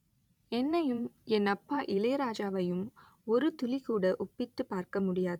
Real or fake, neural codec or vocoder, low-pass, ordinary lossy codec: fake; vocoder, 44.1 kHz, 128 mel bands every 512 samples, BigVGAN v2; 19.8 kHz; none